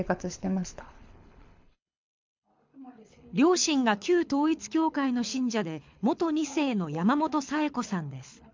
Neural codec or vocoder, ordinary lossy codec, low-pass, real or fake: codec, 24 kHz, 6 kbps, HILCodec; MP3, 64 kbps; 7.2 kHz; fake